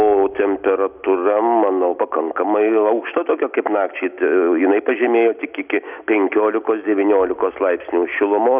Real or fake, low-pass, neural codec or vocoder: real; 3.6 kHz; none